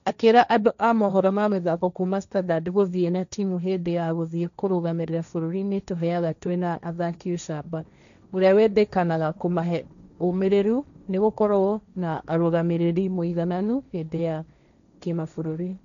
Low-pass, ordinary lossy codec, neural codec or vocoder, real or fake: 7.2 kHz; none; codec, 16 kHz, 1.1 kbps, Voila-Tokenizer; fake